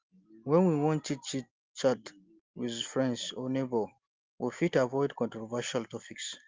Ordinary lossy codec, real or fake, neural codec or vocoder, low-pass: Opus, 32 kbps; real; none; 7.2 kHz